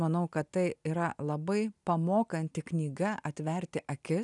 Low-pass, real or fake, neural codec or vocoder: 10.8 kHz; real; none